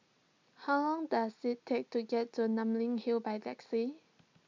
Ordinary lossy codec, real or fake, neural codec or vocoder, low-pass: MP3, 64 kbps; real; none; 7.2 kHz